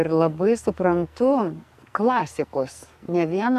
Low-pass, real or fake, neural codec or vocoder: 14.4 kHz; fake; codec, 44.1 kHz, 2.6 kbps, SNAC